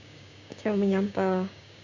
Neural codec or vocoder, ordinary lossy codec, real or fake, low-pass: codec, 16 kHz, 6 kbps, DAC; none; fake; 7.2 kHz